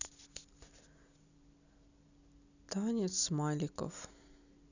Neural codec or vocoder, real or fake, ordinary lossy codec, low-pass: none; real; none; 7.2 kHz